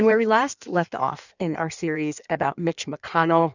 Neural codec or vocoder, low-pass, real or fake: codec, 16 kHz in and 24 kHz out, 1.1 kbps, FireRedTTS-2 codec; 7.2 kHz; fake